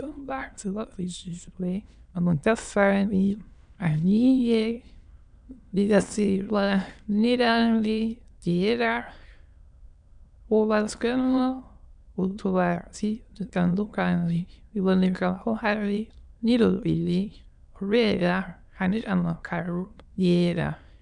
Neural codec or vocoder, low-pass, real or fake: autoencoder, 22.05 kHz, a latent of 192 numbers a frame, VITS, trained on many speakers; 9.9 kHz; fake